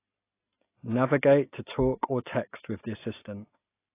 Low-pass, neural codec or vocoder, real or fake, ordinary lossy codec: 3.6 kHz; none; real; AAC, 24 kbps